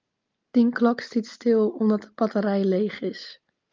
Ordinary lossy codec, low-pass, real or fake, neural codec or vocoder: Opus, 32 kbps; 7.2 kHz; real; none